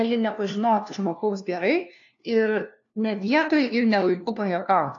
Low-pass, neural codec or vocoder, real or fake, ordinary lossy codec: 7.2 kHz; codec, 16 kHz, 1 kbps, FunCodec, trained on LibriTTS, 50 frames a second; fake; AAC, 48 kbps